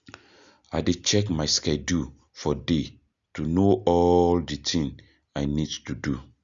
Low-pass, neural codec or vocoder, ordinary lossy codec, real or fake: 7.2 kHz; none; Opus, 64 kbps; real